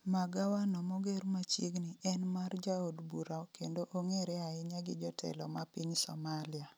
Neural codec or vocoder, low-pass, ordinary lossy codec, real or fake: none; none; none; real